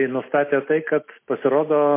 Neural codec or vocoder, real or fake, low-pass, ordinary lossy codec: none; real; 3.6 kHz; MP3, 24 kbps